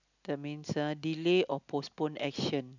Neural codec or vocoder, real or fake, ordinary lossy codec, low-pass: none; real; none; 7.2 kHz